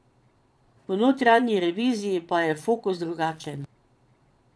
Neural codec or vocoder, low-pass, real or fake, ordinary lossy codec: vocoder, 22.05 kHz, 80 mel bands, Vocos; none; fake; none